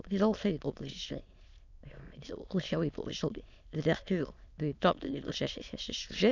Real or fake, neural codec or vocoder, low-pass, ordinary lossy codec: fake; autoencoder, 22.05 kHz, a latent of 192 numbers a frame, VITS, trained on many speakers; 7.2 kHz; none